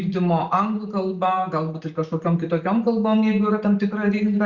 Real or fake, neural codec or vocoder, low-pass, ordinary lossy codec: real; none; 7.2 kHz; Opus, 64 kbps